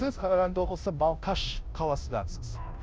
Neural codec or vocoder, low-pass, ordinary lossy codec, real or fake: codec, 16 kHz, 0.5 kbps, FunCodec, trained on Chinese and English, 25 frames a second; none; none; fake